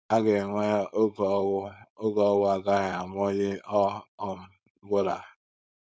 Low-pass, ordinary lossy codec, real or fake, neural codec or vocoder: none; none; fake; codec, 16 kHz, 4.8 kbps, FACodec